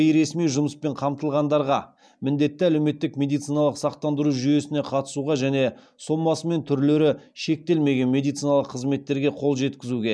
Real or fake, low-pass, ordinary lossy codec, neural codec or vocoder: real; none; none; none